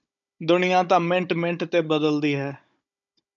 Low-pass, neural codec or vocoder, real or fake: 7.2 kHz; codec, 16 kHz, 16 kbps, FunCodec, trained on Chinese and English, 50 frames a second; fake